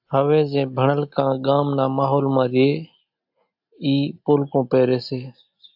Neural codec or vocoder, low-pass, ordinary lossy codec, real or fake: none; 5.4 kHz; AAC, 48 kbps; real